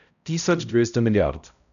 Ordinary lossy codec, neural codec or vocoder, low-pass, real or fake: none; codec, 16 kHz, 0.5 kbps, X-Codec, HuBERT features, trained on balanced general audio; 7.2 kHz; fake